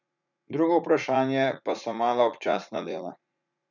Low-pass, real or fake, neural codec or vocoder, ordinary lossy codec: 7.2 kHz; fake; vocoder, 44.1 kHz, 80 mel bands, Vocos; none